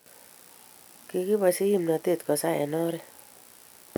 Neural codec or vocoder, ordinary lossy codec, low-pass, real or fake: none; none; none; real